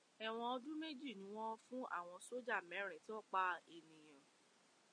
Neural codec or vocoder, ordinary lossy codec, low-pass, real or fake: none; MP3, 48 kbps; 9.9 kHz; real